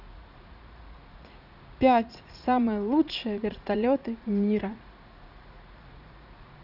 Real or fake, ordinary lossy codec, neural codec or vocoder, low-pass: fake; none; vocoder, 44.1 kHz, 80 mel bands, Vocos; 5.4 kHz